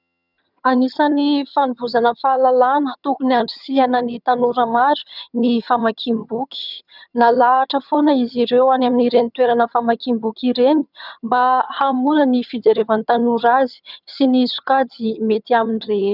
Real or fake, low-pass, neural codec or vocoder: fake; 5.4 kHz; vocoder, 22.05 kHz, 80 mel bands, HiFi-GAN